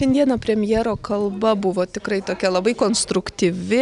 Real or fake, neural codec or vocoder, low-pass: real; none; 10.8 kHz